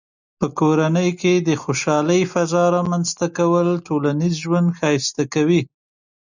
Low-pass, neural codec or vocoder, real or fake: 7.2 kHz; none; real